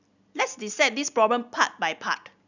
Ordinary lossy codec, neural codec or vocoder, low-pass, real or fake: none; none; 7.2 kHz; real